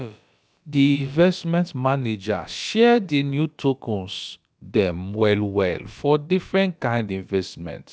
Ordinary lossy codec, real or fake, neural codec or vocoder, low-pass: none; fake; codec, 16 kHz, about 1 kbps, DyCAST, with the encoder's durations; none